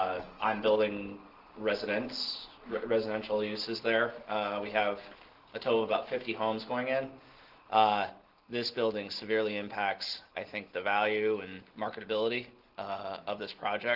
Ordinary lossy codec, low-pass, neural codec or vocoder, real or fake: Opus, 16 kbps; 5.4 kHz; none; real